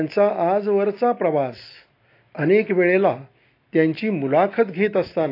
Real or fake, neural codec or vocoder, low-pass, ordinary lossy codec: real; none; 5.4 kHz; AAC, 32 kbps